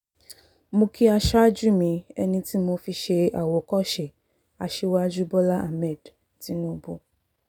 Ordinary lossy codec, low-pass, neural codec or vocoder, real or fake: none; 19.8 kHz; none; real